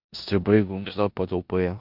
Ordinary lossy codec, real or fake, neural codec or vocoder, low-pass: AAC, 48 kbps; fake; codec, 16 kHz in and 24 kHz out, 0.9 kbps, LongCat-Audio-Codec, four codebook decoder; 5.4 kHz